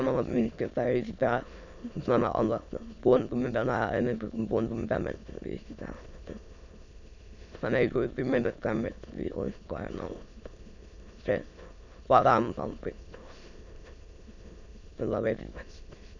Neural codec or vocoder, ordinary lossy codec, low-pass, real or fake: autoencoder, 22.05 kHz, a latent of 192 numbers a frame, VITS, trained on many speakers; none; 7.2 kHz; fake